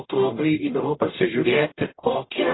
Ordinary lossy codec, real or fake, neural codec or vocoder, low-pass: AAC, 16 kbps; fake; codec, 44.1 kHz, 0.9 kbps, DAC; 7.2 kHz